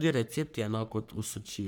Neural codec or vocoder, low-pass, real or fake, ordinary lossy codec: codec, 44.1 kHz, 3.4 kbps, Pupu-Codec; none; fake; none